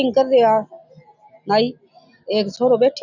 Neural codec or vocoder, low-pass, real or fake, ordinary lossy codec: none; 7.2 kHz; real; none